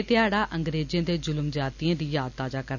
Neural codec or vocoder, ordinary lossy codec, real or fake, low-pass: none; none; real; 7.2 kHz